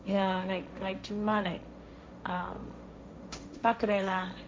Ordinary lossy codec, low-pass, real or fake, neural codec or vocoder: none; none; fake; codec, 16 kHz, 1.1 kbps, Voila-Tokenizer